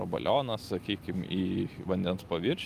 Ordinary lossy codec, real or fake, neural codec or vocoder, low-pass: Opus, 32 kbps; real; none; 14.4 kHz